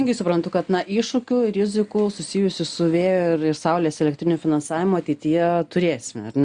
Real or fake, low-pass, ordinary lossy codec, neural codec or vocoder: real; 9.9 kHz; Opus, 64 kbps; none